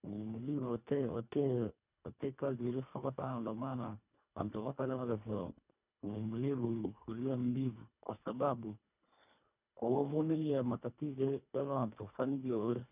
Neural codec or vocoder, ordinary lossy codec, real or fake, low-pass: codec, 24 kHz, 1.5 kbps, HILCodec; none; fake; 3.6 kHz